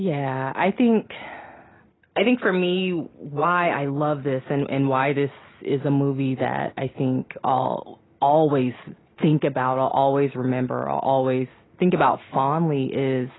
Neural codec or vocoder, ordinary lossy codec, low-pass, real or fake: none; AAC, 16 kbps; 7.2 kHz; real